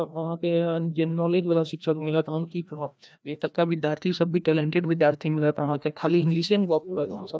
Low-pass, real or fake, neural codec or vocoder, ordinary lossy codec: none; fake; codec, 16 kHz, 1 kbps, FreqCodec, larger model; none